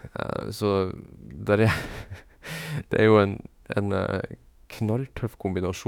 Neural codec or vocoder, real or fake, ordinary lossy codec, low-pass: autoencoder, 48 kHz, 32 numbers a frame, DAC-VAE, trained on Japanese speech; fake; none; 19.8 kHz